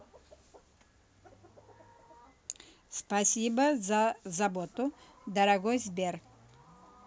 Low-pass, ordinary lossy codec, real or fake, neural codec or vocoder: none; none; real; none